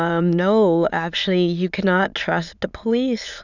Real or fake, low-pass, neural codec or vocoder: fake; 7.2 kHz; autoencoder, 22.05 kHz, a latent of 192 numbers a frame, VITS, trained on many speakers